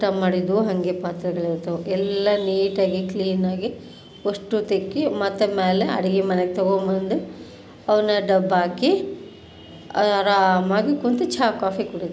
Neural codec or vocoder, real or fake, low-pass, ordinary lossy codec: none; real; none; none